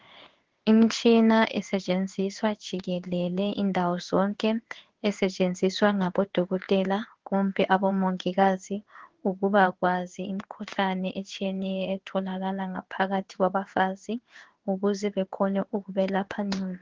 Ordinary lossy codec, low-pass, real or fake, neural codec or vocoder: Opus, 16 kbps; 7.2 kHz; fake; codec, 16 kHz in and 24 kHz out, 1 kbps, XY-Tokenizer